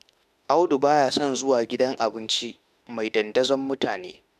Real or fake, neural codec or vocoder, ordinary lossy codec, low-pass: fake; autoencoder, 48 kHz, 32 numbers a frame, DAC-VAE, trained on Japanese speech; none; 14.4 kHz